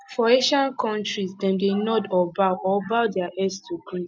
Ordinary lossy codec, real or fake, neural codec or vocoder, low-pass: none; real; none; none